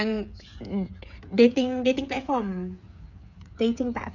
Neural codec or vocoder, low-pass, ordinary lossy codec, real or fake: codec, 16 kHz, 8 kbps, FreqCodec, smaller model; 7.2 kHz; none; fake